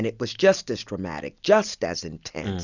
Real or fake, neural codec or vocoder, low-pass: real; none; 7.2 kHz